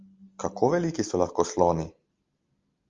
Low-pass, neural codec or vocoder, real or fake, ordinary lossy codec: 7.2 kHz; none; real; Opus, 32 kbps